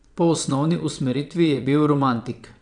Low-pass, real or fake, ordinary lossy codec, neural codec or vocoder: 9.9 kHz; fake; none; vocoder, 22.05 kHz, 80 mel bands, WaveNeXt